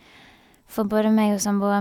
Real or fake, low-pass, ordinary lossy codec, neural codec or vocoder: real; 19.8 kHz; none; none